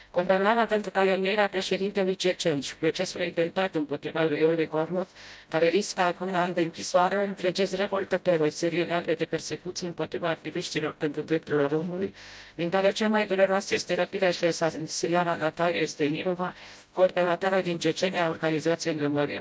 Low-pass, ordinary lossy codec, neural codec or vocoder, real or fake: none; none; codec, 16 kHz, 0.5 kbps, FreqCodec, smaller model; fake